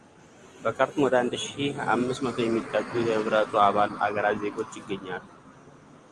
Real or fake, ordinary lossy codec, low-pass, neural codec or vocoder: real; Opus, 32 kbps; 10.8 kHz; none